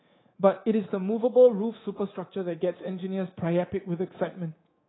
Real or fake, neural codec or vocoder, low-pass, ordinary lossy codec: fake; codec, 24 kHz, 3.1 kbps, DualCodec; 7.2 kHz; AAC, 16 kbps